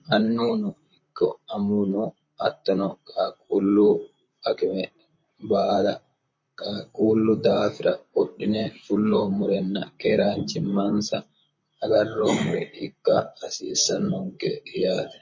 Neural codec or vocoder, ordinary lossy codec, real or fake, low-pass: vocoder, 44.1 kHz, 128 mel bands, Pupu-Vocoder; MP3, 32 kbps; fake; 7.2 kHz